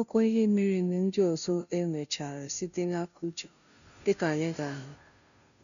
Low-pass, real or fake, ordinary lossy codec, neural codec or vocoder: 7.2 kHz; fake; none; codec, 16 kHz, 0.5 kbps, FunCodec, trained on Chinese and English, 25 frames a second